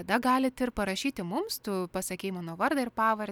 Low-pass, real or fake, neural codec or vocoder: 19.8 kHz; real; none